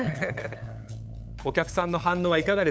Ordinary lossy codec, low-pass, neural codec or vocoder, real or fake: none; none; codec, 16 kHz, 8 kbps, FunCodec, trained on LibriTTS, 25 frames a second; fake